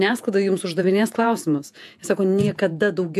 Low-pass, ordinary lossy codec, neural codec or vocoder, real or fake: 14.4 kHz; AAC, 96 kbps; vocoder, 48 kHz, 128 mel bands, Vocos; fake